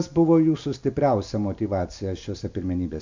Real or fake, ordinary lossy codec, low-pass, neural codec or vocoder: real; MP3, 64 kbps; 7.2 kHz; none